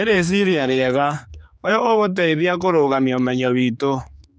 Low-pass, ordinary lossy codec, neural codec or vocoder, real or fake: none; none; codec, 16 kHz, 4 kbps, X-Codec, HuBERT features, trained on general audio; fake